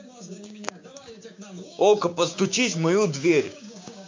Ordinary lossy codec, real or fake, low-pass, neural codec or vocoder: MP3, 48 kbps; fake; 7.2 kHz; codec, 16 kHz, 6 kbps, DAC